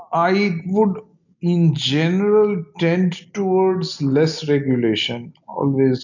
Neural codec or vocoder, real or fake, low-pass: none; real; 7.2 kHz